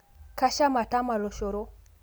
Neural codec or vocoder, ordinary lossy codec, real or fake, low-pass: none; none; real; none